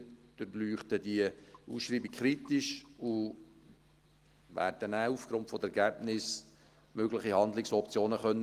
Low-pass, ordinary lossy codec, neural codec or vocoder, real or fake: 14.4 kHz; Opus, 24 kbps; none; real